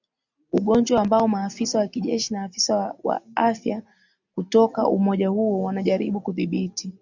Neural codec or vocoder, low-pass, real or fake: none; 7.2 kHz; real